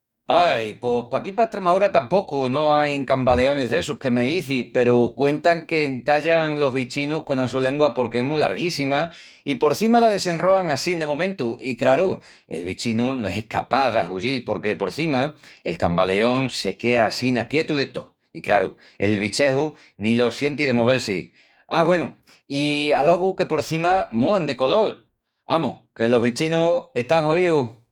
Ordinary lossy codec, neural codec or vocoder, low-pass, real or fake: none; codec, 44.1 kHz, 2.6 kbps, DAC; 19.8 kHz; fake